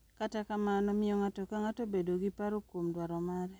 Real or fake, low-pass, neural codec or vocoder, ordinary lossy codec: real; none; none; none